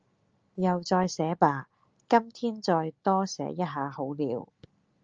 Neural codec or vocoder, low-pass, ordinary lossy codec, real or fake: none; 7.2 kHz; Opus, 24 kbps; real